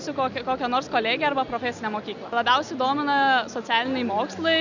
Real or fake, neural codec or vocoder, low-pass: real; none; 7.2 kHz